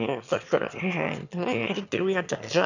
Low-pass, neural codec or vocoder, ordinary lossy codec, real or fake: 7.2 kHz; autoencoder, 22.05 kHz, a latent of 192 numbers a frame, VITS, trained on one speaker; none; fake